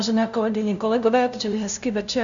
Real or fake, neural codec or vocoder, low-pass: fake; codec, 16 kHz, 0.5 kbps, FunCodec, trained on LibriTTS, 25 frames a second; 7.2 kHz